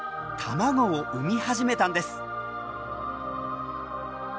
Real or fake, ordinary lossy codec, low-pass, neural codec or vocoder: real; none; none; none